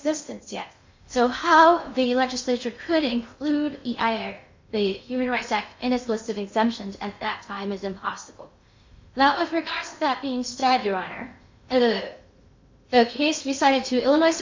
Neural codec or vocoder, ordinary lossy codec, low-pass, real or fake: codec, 16 kHz in and 24 kHz out, 0.6 kbps, FocalCodec, streaming, 2048 codes; MP3, 48 kbps; 7.2 kHz; fake